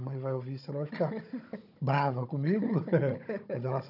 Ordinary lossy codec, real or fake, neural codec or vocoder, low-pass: none; fake; codec, 16 kHz, 16 kbps, FunCodec, trained on Chinese and English, 50 frames a second; 5.4 kHz